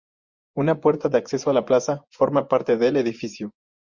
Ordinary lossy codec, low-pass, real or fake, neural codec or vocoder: Opus, 64 kbps; 7.2 kHz; fake; vocoder, 24 kHz, 100 mel bands, Vocos